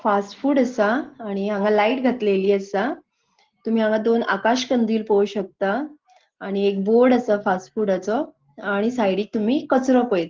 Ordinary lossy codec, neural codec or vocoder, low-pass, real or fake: Opus, 16 kbps; none; 7.2 kHz; real